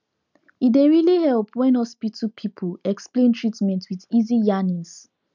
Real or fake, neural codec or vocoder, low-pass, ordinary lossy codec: real; none; 7.2 kHz; none